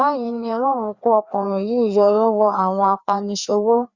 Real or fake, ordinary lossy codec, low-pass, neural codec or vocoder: fake; Opus, 64 kbps; 7.2 kHz; codec, 16 kHz, 2 kbps, FreqCodec, larger model